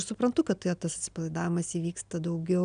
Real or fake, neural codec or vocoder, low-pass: real; none; 9.9 kHz